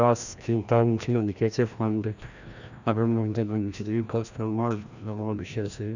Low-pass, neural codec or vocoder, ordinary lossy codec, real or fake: 7.2 kHz; codec, 16 kHz, 1 kbps, FreqCodec, larger model; none; fake